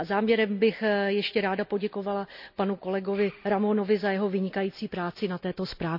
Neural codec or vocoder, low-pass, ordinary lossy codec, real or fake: none; 5.4 kHz; none; real